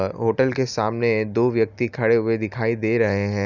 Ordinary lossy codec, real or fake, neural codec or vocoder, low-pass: none; real; none; 7.2 kHz